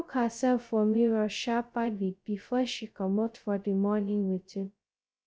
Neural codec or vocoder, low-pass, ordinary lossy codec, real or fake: codec, 16 kHz, 0.2 kbps, FocalCodec; none; none; fake